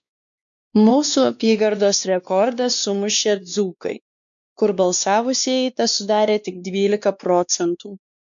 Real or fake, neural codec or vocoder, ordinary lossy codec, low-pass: fake; codec, 16 kHz, 2 kbps, X-Codec, WavLM features, trained on Multilingual LibriSpeech; MP3, 64 kbps; 7.2 kHz